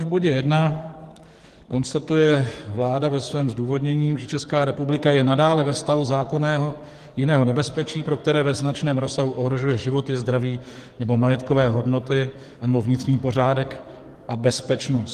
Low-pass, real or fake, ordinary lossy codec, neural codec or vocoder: 14.4 kHz; fake; Opus, 24 kbps; codec, 44.1 kHz, 2.6 kbps, SNAC